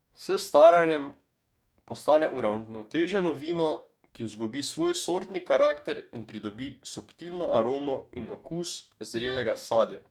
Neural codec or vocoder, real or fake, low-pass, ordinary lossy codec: codec, 44.1 kHz, 2.6 kbps, DAC; fake; 19.8 kHz; none